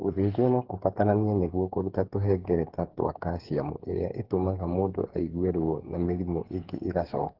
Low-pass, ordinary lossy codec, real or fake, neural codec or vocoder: 5.4 kHz; Opus, 16 kbps; fake; codec, 16 kHz, 8 kbps, FreqCodec, smaller model